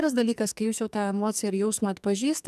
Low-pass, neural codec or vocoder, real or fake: 14.4 kHz; codec, 44.1 kHz, 2.6 kbps, SNAC; fake